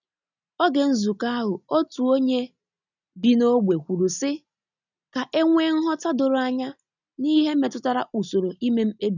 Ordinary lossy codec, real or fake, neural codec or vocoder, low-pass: none; real; none; 7.2 kHz